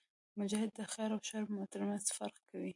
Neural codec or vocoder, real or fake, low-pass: none; real; 10.8 kHz